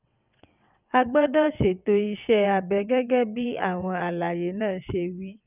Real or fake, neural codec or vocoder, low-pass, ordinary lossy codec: fake; vocoder, 22.05 kHz, 80 mel bands, WaveNeXt; 3.6 kHz; none